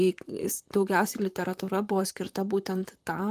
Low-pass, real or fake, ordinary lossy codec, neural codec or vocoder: 14.4 kHz; fake; Opus, 32 kbps; vocoder, 44.1 kHz, 128 mel bands, Pupu-Vocoder